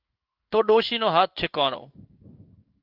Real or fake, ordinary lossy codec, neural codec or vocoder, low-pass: fake; Opus, 24 kbps; codec, 16 kHz in and 24 kHz out, 1 kbps, XY-Tokenizer; 5.4 kHz